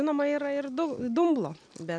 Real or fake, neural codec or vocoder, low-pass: real; none; 9.9 kHz